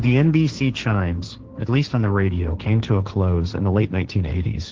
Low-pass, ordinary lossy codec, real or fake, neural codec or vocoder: 7.2 kHz; Opus, 16 kbps; fake; codec, 16 kHz, 1.1 kbps, Voila-Tokenizer